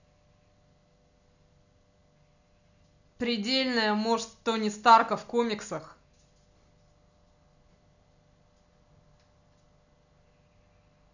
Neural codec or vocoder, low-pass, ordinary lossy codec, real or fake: none; 7.2 kHz; none; real